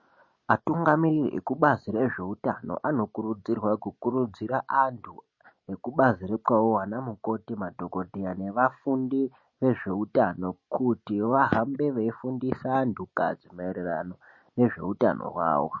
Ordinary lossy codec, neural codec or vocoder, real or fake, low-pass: MP3, 32 kbps; none; real; 7.2 kHz